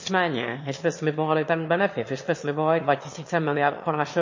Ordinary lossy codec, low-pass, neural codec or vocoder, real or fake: MP3, 32 kbps; 7.2 kHz; autoencoder, 22.05 kHz, a latent of 192 numbers a frame, VITS, trained on one speaker; fake